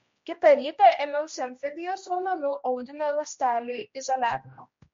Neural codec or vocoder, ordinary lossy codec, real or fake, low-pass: codec, 16 kHz, 1 kbps, X-Codec, HuBERT features, trained on general audio; MP3, 48 kbps; fake; 7.2 kHz